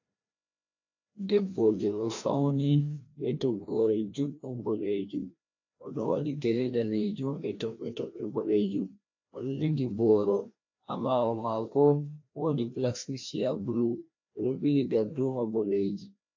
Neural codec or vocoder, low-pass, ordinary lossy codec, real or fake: codec, 16 kHz, 1 kbps, FreqCodec, larger model; 7.2 kHz; AAC, 48 kbps; fake